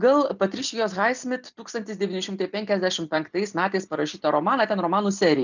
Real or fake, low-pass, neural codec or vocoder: real; 7.2 kHz; none